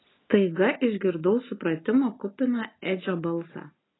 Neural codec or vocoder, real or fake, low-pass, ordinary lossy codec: none; real; 7.2 kHz; AAC, 16 kbps